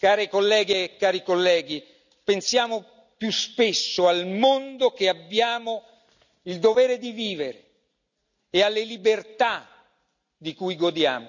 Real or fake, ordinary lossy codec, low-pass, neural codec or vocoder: real; none; 7.2 kHz; none